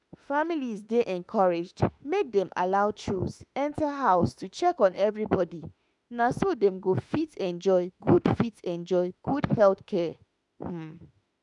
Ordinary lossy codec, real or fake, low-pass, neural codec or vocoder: none; fake; 10.8 kHz; autoencoder, 48 kHz, 32 numbers a frame, DAC-VAE, trained on Japanese speech